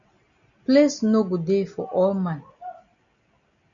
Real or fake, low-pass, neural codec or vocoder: real; 7.2 kHz; none